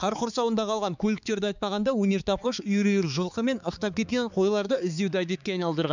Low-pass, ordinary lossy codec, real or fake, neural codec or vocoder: 7.2 kHz; none; fake; codec, 16 kHz, 4 kbps, X-Codec, HuBERT features, trained on balanced general audio